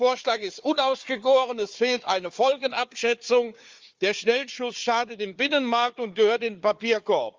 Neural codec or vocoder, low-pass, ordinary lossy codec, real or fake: codec, 16 kHz, 4 kbps, FunCodec, trained on Chinese and English, 50 frames a second; 7.2 kHz; Opus, 32 kbps; fake